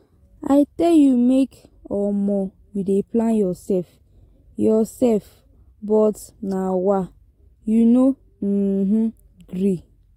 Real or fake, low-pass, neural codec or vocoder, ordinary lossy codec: real; 19.8 kHz; none; AAC, 48 kbps